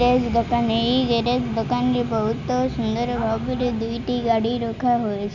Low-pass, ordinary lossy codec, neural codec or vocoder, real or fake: 7.2 kHz; none; none; real